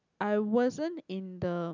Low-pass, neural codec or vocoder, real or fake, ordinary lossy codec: 7.2 kHz; none; real; none